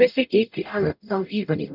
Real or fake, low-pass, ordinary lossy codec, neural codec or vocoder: fake; 5.4 kHz; none; codec, 44.1 kHz, 0.9 kbps, DAC